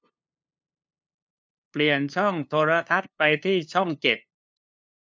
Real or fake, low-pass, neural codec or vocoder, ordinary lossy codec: fake; none; codec, 16 kHz, 2 kbps, FunCodec, trained on LibriTTS, 25 frames a second; none